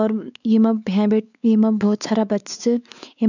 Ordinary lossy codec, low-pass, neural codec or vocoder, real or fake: none; 7.2 kHz; codec, 16 kHz, 4 kbps, X-Codec, WavLM features, trained on Multilingual LibriSpeech; fake